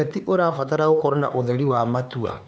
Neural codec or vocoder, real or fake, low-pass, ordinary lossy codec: codec, 16 kHz, 4 kbps, X-Codec, HuBERT features, trained on LibriSpeech; fake; none; none